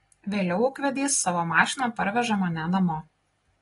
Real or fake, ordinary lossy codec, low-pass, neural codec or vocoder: real; AAC, 32 kbps; 10.8 kHz; none